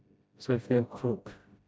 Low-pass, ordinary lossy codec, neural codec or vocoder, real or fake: none; none; codec, 16 kHz, 0.5 kbps, FreqCodec, smaller model; fake